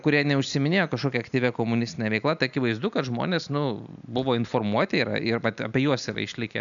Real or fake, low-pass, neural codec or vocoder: real; 7.2 kHz; none